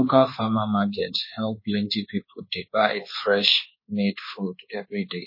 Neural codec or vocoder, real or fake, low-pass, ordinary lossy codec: codec, 24 kHz, 1.2 kbps, DualCodec; fake; 5.4 kHz; MP3, 24 kbps